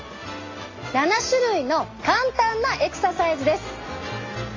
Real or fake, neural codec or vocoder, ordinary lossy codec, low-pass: real; none; AAC, 32 kbps; 7.2 kHz